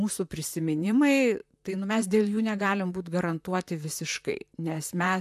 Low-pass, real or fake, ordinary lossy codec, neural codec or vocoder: 14.4 kHz; fake; AAC, 96 kbps; vocoder, 44.1 kHz, 128 mel bands, Pupu-Vocoder